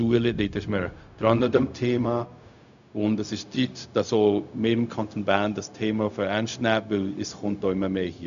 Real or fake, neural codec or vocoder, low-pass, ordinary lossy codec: fake; codec, 16 kHz, 0.4 kbps, LongCat-Audio-Codec; 7.2 kHz; none